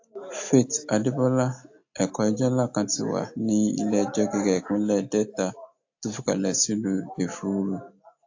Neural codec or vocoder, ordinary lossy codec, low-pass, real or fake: none; AAC, 48 kbps; 7.2 kHz; real